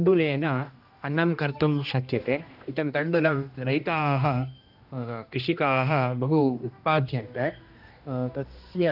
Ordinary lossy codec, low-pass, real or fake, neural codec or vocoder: none; 5.4 kHz; fake; codec, 16 kHz, 1 kbps, X-Codec, HuBERT features, trained on general audio